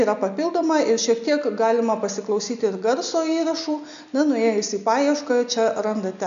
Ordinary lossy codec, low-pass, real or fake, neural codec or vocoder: MP3, 64 kbps; 7.2 kHz; real; none